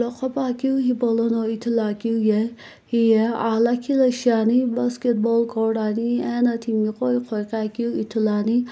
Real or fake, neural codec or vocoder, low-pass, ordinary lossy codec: real; none; none; none